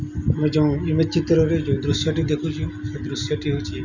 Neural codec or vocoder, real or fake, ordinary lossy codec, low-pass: none; real; none; 7.2 kHz